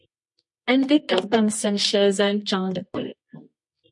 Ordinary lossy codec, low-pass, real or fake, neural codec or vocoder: MP3, 48 kbps; 10.8 kHz; fake; codec, 24 kHz, 0.9 kbps, WavTokenizer, medium music audio release